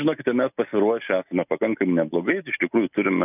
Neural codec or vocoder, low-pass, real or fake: none; 3.6 kHz; real